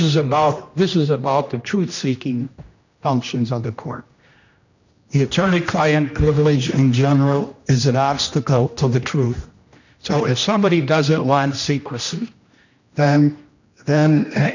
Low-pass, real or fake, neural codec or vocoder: 7.2 kHz; fake; codec, 16 kHz, 1 kbps, X-Codec, HuBERT features, trained on general audio